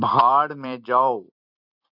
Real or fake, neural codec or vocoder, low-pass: fake; vocoder, 44.1 kHz, 128 mel bands every 256 samples, BigVGAN v2; 5.4 kHz